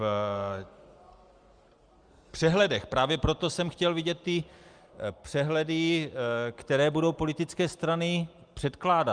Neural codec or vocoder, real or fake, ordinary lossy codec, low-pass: none; real; Opus, 32 kbps; 9.9 kHz